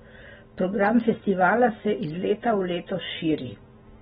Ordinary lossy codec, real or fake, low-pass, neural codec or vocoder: AAC, 16 kbps; real; 10.8 kHz; none